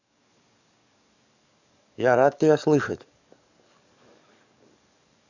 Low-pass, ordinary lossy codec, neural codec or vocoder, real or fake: 7.2 kHz; none; codec, 44.1 kHz, 7.8 kbps, DAC; fake